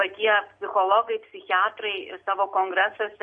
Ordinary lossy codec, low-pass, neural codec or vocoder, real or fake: MP3, 48 kbps; 9.9 kHz; none; real